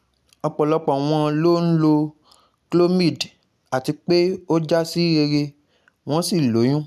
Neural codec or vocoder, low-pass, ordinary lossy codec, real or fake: none; 14.4 kHz; none; real